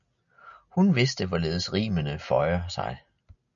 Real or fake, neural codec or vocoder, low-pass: real; none; 7.2 kHz